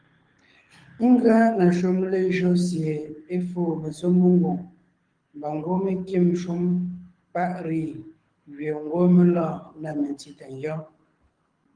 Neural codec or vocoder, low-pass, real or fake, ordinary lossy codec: codec, 24 kHz, 6 kbps, HILCodec; 9.9 kHz; fake; Opus, 24 kbps